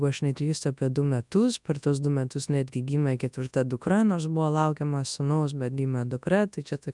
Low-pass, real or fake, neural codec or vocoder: 10.8 kHz; fake; codec, 24 kHz, 0.9 kbps, WavTokenizer, large speech release